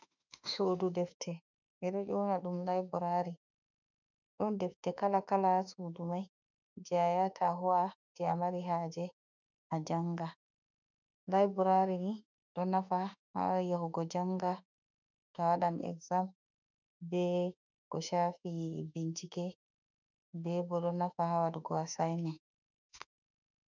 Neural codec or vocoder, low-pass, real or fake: autoencoder, 48 kHz, 32 numbers a frame, DAC-VAE, trained on Japanese speech; 7.2 kHz; fake